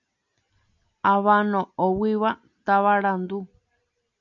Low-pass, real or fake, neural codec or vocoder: 7.2 kHz; real; none